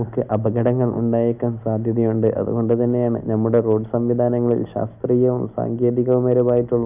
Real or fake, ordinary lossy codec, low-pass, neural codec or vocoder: real; none; 3.6 kHz; none